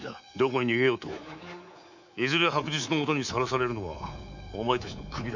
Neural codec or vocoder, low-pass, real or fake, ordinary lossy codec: codec, 24 kHz, 3.1 kbps, DualCodec; 7.2 kHz; fake; none